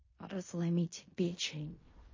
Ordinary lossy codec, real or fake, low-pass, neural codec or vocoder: MP3, 32 kbps; fake; 7.2 kHz; codec, 16 kHz in and 24 kHz out, 0.4 kbps, LongCat-Audio-Codec, fine tuned four codebook decoder